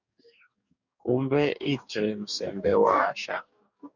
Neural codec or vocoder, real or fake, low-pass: codec, 44.1 kHz, 2.6 kbps, DAC; fake; 7.2 kHz